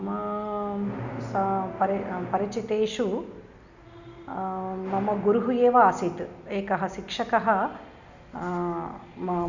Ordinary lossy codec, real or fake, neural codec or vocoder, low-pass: none; real; none; 7.2 kHz